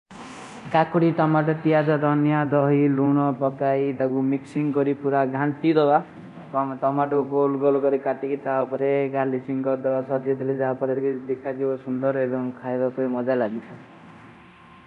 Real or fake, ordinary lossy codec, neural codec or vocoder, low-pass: fake; none; codec, 24 kHz, 0.9 kbps, DualCodec; 10.8 kHz